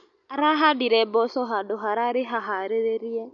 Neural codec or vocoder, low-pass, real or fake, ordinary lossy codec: none; 7.2 kHz; real; none